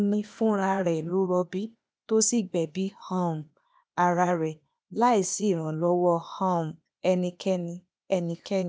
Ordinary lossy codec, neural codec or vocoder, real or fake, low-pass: none; codec, 16 kHz, 0.8 kbps, ZipCodec; fake; none